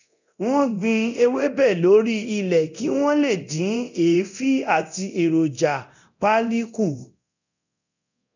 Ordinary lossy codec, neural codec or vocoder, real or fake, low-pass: none; codec, 24 kHz, 0.9 kbps, DualCodec; fake; 7.2 kHz